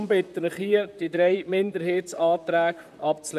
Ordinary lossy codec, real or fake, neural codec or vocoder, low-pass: none; fake; vocoder, 44.1 kHz, 128 mel bands, Pupu-Vocoder; 14.4 kHz